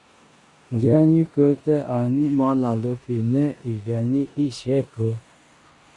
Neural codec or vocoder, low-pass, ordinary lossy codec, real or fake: codec, 16 kHz in and 24 kHz out, 0.9 kbps, LongCat-Audio-Codec, four codebook decoder; 10.8 kHz; Opus, 64 kbps; fake